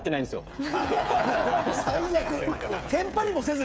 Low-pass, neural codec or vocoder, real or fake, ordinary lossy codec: none; codec, 16 kHz, 8 kbps, FreqCodec, smaller model; fake; none